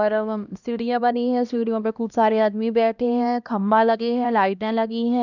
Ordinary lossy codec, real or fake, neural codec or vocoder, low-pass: none; fake; codec, 16 kHz, 1 kbps, X-Codec, HuBERT features, trained on LibriSpeech; 7.2 kHz